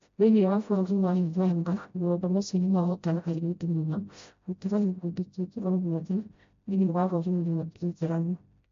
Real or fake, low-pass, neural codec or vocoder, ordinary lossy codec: fake; 7.2 kHz; codec, 16 kHz, 0.5 kbps, FreqCodec, smaller model; MP3, 48 kbps